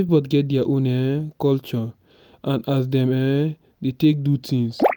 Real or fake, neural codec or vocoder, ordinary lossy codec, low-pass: real; none; none; none